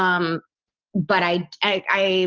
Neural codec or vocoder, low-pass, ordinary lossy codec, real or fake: none; 7.2 kHz; Opus, 24 kbps; real